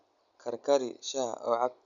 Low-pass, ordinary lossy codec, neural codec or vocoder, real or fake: 7.2 kHz; none; none; real